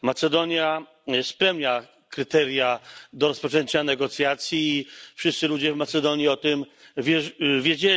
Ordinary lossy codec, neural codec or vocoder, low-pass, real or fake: none; none; none; real